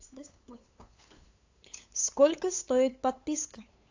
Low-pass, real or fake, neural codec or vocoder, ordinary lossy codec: 7.2 kHz; fake; codec, 16 kHz, 8 kbps, FunCodec, trained on LibriTTS, 25 frames a second; AAC, 48 kbps